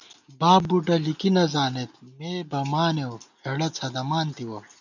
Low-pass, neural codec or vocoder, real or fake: 7.2 kHz; none; real